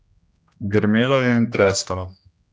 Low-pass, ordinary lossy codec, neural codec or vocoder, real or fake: none; none; codec, 16 kHz, 1 kbps, X-Codec, HuBERT features, trained on general audio; fake